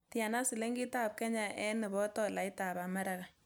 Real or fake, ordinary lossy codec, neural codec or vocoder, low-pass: real; none; none; none